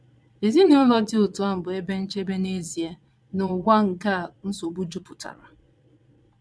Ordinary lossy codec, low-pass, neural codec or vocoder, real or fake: none; none; vocoder, 22.05 kHz, 80 mel bands, Vocos; fake